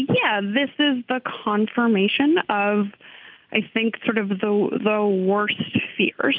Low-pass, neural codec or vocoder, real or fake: 5.4 kHz; none; real